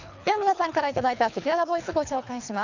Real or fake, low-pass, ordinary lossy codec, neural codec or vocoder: fake; 7.2 kHz; none; codec, 24 kHz, 3 kbps, HILCodec